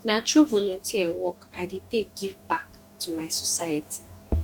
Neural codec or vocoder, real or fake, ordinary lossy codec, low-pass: codec, 44.1 kHz, 2.6 kbps, DAC; fake; none; 19.8 kHz